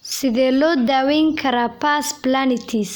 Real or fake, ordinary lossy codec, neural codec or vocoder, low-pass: real; none; none; none